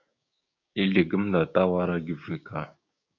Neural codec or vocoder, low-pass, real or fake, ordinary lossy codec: codec, 16 kHz, 6 kbps, DAC; 7.2 kHz; fake; AAC, 48 kbps